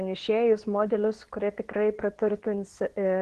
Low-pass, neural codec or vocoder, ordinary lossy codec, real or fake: 10.8 kHz; codec, 24 kHz, 0.9 kbps, WavTokenizer, medium speech release version 2; Opus, 16 kbps; fake